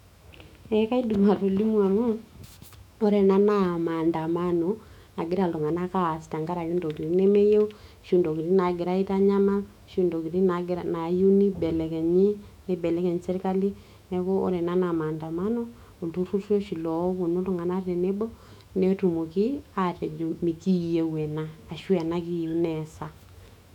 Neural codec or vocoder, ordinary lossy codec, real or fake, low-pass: autoencoder, 48 kHz, 128 numbers a frame, DAC-VAE, trained on Japanese speech; none; fake; 19.8 kHz